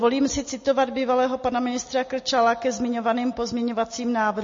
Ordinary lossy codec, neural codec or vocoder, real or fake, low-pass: MP3, 32 kbps; none; real; 7.2 kHz